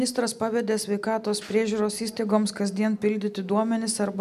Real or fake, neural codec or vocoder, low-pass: real; none; 14.4 kHz